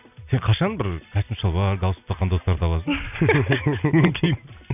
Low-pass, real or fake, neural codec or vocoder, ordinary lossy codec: 3.6 kHz; real; none; none